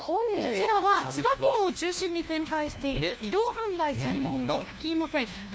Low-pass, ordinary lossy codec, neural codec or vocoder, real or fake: none; none; codec, 16 kHz, 1 kbps, FunCodec, trained on LibriTTS, 50 frames a second; fake